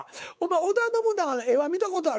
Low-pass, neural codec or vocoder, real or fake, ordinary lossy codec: none; codec, 16 kHz, 4 kbps, X-Codec, WavLM features, trained on Multilingual LibriSpeech; fake; none